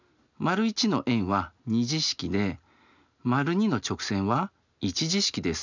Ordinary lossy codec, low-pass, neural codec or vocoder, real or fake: none; 7.2 kHz; none; real